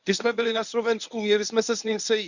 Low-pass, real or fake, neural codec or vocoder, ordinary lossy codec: 7.2 kHz; fake; codec, 24 kHz, 0.9 kbps, WavTokenizer, medium speech release version 1; none